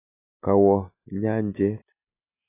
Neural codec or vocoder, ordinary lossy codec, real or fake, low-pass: none; AAC, 16 kbps; real; 3.6 kHz